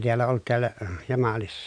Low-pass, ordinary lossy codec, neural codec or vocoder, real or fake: 9.9 kHz; none; none; real